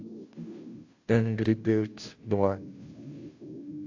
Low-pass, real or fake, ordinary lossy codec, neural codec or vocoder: 7.2 kHz; fake; AAC, 48 kbps; codec, 16 kHz, 0.5 kbps, FunCodec, trained on Chinese and English, 25 frames a second